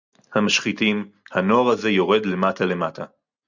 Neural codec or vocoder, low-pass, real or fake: none; 7.2 kHz; real